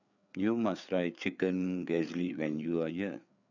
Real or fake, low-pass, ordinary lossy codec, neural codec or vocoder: fake; 7.2 kHz; none; codec, 16 kHz, 4 kbps, FreqCodec, larger model